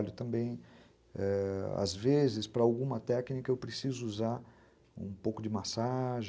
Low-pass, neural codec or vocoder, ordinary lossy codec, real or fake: none; none; none; real